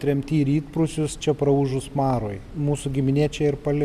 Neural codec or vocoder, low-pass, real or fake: none; 14.4 kHz; real